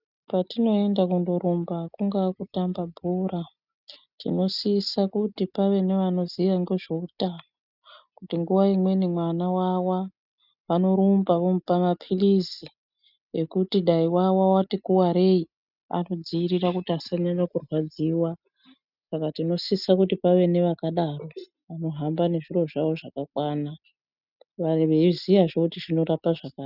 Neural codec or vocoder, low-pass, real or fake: none; 5.4 kHz; real